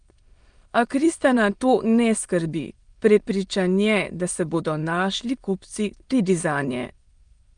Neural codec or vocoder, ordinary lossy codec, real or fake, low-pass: autoencoder, 22.05 kHz, a latent of 192 numbers a frame, VITS, trained on many speakers; Opus, 32 kbps; fake; 9.9 kHz